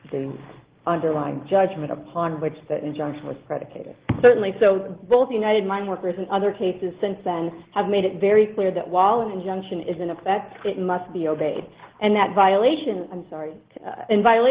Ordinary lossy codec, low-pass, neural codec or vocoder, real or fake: Opus, 16 kbps; 3.6 kHz; none; real